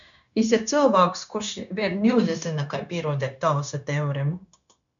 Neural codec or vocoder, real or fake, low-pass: codec, 16 kHz, 0.9 kbps, LongCat-Audio-Codec; fake; 7.2 kHz